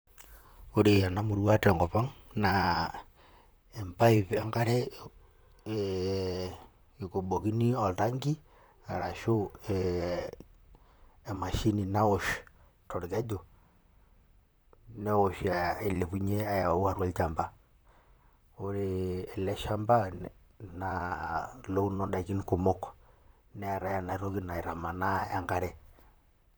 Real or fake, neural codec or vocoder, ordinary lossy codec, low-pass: fake; vocoder, 44.1 kHz, 128 mel bands, Pupu-Vocoder; none; none